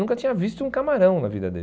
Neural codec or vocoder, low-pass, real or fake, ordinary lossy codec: none; none; real; none